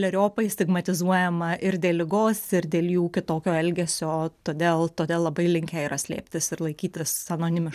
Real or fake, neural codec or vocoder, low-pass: real; none; 14.4 kHz